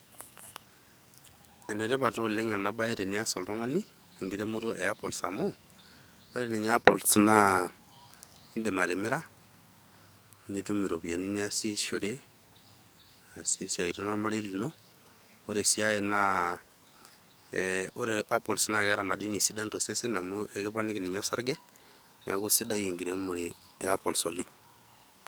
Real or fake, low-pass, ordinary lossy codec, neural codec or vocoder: fake; none; none; codec, 44.1 kHz, 2.6 kbps, SNAC